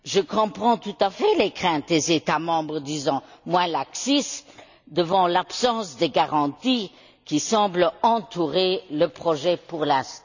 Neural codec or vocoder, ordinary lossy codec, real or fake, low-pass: none; none; real; 7.2 kHz